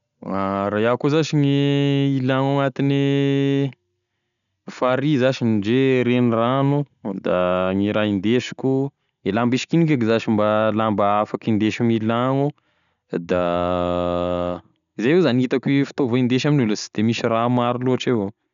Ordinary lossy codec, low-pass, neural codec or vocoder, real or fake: none; 7.2 kHz; none; real